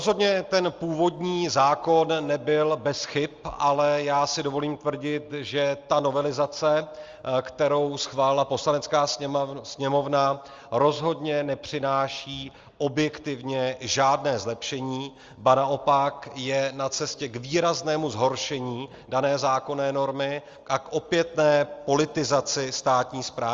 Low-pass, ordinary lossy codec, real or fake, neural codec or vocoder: 7.2 kHz; Opus, 32 kbps; real; none